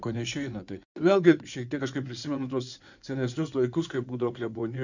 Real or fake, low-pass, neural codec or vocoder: fake; 7.2 kHz; codec, 16 kHz in and 24 kHz out, 2.2 kbps, FireRedTTS-2 codec